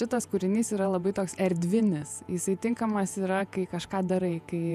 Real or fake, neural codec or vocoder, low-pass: fake; vocoder, 48 kHz, 128 mel bands, Vocos; 14.4 kHz